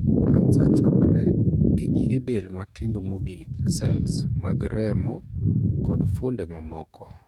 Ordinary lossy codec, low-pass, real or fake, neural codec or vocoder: none; 19.8 kHz; fake; codec, 44.1 kHz, 2.6 kbps, DAC